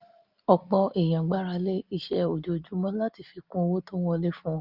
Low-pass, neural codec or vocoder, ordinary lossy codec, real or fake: 5.4 kHz; none; Opus, 24 kbps; real